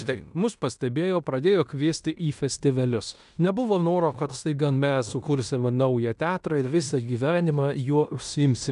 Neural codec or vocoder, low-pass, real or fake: codec, 16 kHz in and 24 kHz out, 0.9 kbps, LongCat-Audio-Codec, fine tuned four codebook decoder; 10.8 kHz; fake